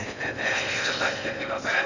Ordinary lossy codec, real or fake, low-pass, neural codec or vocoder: none; fake; 7.2 kHz; codec, 16 kHz in and 24 kHz out, 0.6 kbps, FocalCodec, streaming, 4096 codes